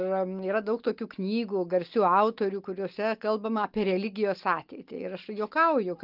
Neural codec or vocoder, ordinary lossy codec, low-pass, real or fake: none; Opus, 24 kbps; 5.4 kHz; real